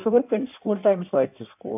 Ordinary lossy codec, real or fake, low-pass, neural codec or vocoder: none; fake; 3.6 kHz; codec, 16 kHz in and 24 kHz out, 1.1 kbps, FireRedTTS-2 codec